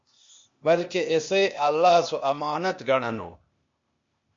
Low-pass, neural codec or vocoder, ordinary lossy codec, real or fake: 7.2 kHz; codec, 16 kHz, 0.8 kbps, ZipCodec; MP3, 48 kbps; fake